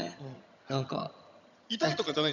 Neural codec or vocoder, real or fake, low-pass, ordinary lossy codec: vocoder, 22.05 kHz, 80 mel bands, HiFi-GAN; fake; 7.2 kHz; none